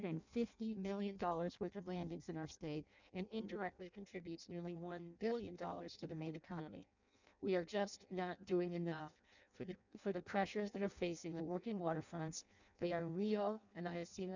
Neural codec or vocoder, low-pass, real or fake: codec, 16 kHz in and 24 kHz out, 0.6 kbps, FireRedTTS-2 codec; 7.2 kHz; fake